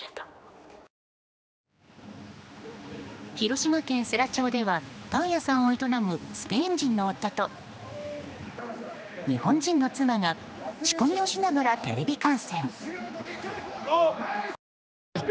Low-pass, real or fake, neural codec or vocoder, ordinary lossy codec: none; fake; codec, 16 kHz, 2 kbps, X-Codec, HuBERT features, trained on general audio; none